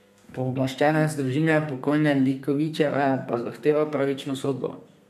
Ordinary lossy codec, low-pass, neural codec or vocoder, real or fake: none; 14.4 kHz; codec, 32 kHz, 1.9 kbps, SNAC; fake